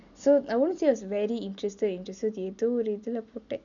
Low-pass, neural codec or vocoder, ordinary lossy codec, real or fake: 7.2 kHz; none; none; real